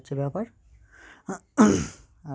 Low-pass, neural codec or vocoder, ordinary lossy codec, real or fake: none; none; none; real